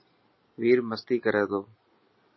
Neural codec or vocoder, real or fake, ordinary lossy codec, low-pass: none; real; MP3, 24 kbps; 7.2 kHz